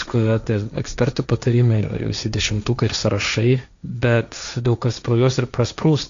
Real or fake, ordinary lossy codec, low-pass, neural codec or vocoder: fake; MP3, 64 kbps; 7.2 kHz; codec, 16 kHz, 1.1 kbps, Voila-Tokenizer